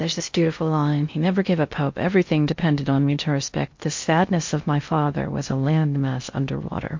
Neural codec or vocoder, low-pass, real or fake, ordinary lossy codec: codec, 16 kHz in and 24 kHz out, 0.6 kbps, FocalCodec, streaming, 4096 codes; 7.2 kHz; fake; MP3, 48 kbps